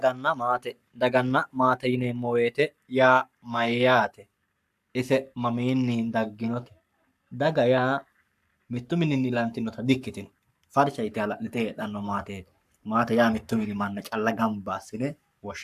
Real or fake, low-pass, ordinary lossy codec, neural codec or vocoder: fake; 14.4 kHz; AAC, 96 kbps; codec, 44.1 kHz, 7.8 kbps, Pupu-Codec